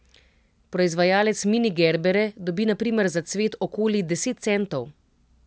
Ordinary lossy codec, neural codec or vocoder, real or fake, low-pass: none; none; real; none